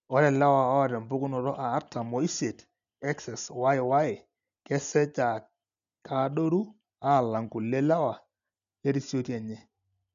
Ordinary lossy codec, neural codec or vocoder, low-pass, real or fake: none; none; 7.2 kHz; real